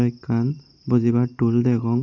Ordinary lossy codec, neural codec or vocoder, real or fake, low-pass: none; none; real; 7.2 kHz